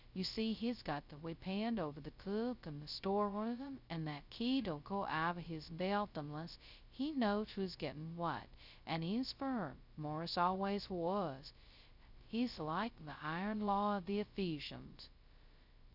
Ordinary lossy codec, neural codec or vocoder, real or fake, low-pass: Opus, 64 kbps; codec, 16 kHz, 0.2 kbps, FocalCodec; fake; 5.4 kHz